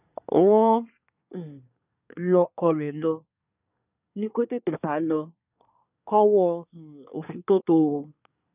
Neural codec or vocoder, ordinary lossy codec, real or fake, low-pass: codec, 24 kHz, 1 kbps, SNAC; none; fake; 3.6 kHz